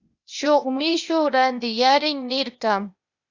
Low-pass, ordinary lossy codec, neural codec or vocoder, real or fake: 7.2 kHz; Opus, 64 kbps; codec, 16 kHz, 0.8 kbps, ZipCodec; fake